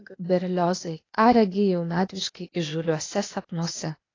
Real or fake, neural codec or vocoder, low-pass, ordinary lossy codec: fake; codec, 16 kHz, 0.8 kbps, ZipCodec; 7.2 kHz; AAC, 32 kbps